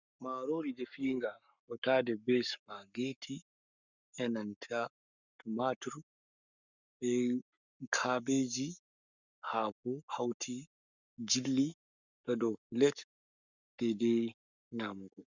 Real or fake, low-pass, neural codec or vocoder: fake; 7.2 kHz; codec, 44.1 kHz, 7.8 kbps, Pupu-Codec